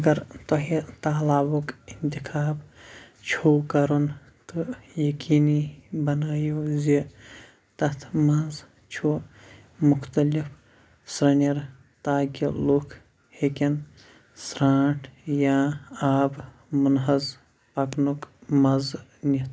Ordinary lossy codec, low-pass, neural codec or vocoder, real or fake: none; none; none; real